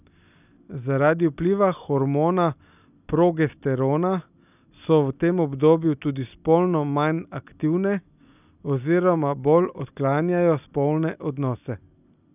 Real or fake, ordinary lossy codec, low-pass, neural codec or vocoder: real; none; 3.6 kHz; none